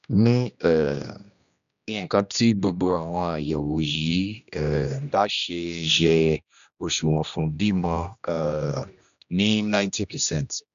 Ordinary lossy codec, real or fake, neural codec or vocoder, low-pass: none; fake; codec, 16 kHz, 1 kbps, X-Codec, HuBERT features, trained on general audio; 7.2 kHz